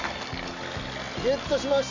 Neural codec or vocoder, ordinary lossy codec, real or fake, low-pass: none; none; real; 7.2 kHz